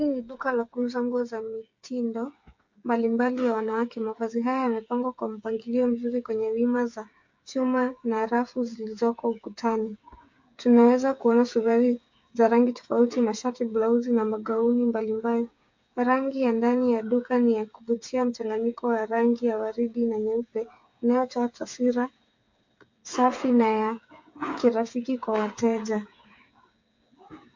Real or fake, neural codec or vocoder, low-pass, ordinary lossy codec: fake; codec, 16 kHz, 8 kbps, FreqCodec, smaller model; 7.2 kHz; MP3, 48 kbps